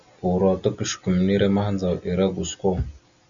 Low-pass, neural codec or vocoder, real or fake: 7.2 kHz; none; real